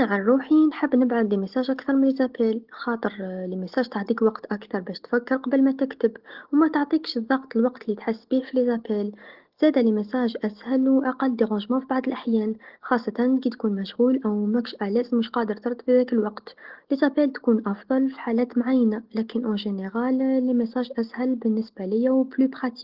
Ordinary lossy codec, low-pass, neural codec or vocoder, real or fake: Opus, 16 kbps; 5.4 kHz; none; real